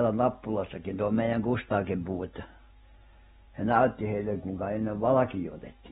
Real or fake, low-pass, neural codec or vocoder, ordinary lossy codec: real; 19.8 kHz; none; AAC, 16 kbps